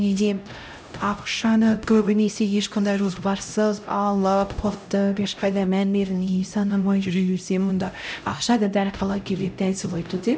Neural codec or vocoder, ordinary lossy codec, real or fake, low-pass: codec, 16 kHz, 0.5 kbps, X-Codec, HuBERT features, trained on LibriSpeech; none; fake; none